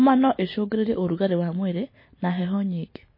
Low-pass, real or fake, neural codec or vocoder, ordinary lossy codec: 5.4 kHz; real; none; MP3, 24 kbps